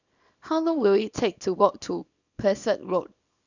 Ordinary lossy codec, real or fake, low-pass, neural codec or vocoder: none; fake; 7.2 kHz; codec, 24 kHz, 0.9 kbps, WavTokenizer, small release